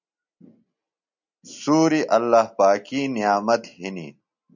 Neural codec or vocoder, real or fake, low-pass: none; real; 7.2 kHz